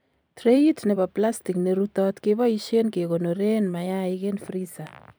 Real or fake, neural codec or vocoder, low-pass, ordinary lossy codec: fake; vocoder, 44.1 kHz, 128 mel bands every 256 samples, BigVGAN v2; none; none